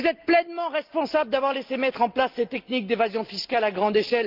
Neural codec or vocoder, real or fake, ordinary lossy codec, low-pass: none; real; Opus, 32 kbps; 5.4 kHz